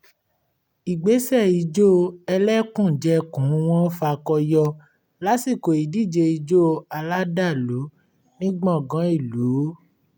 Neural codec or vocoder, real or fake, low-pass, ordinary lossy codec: none; real; 19.8 kHz; none